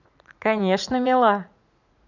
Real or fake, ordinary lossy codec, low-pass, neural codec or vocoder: fake; none; 7.2 kHz; vocoder, 44.1 kHz, 80 mel bands, Vocos